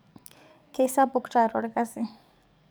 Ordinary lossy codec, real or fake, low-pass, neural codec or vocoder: none; fake; none; codec, 44.1 kHz, 7.8 kbps, DAC